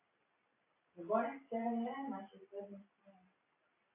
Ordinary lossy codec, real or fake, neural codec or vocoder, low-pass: AAC, 24 kbps; real; none; 3.6 kHz